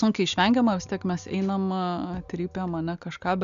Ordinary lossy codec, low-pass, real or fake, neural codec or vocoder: AAC, 96 kbps; 7.2 kHz; real; none